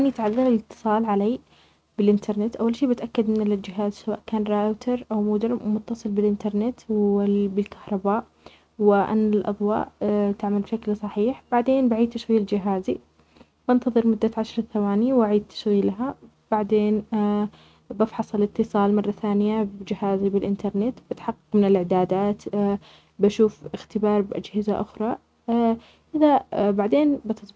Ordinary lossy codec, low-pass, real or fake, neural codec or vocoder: none; none; real; none